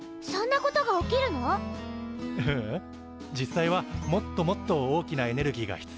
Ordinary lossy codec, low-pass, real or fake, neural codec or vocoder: none; none; real; none